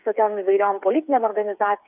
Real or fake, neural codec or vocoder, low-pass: fake; codec, 16 kHz, 8 kbps, FreqCodec, smaller model; 3.6 kHz